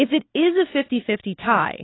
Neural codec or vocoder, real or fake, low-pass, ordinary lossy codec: none; real; 7.2 kHz; AAC, 16 kbps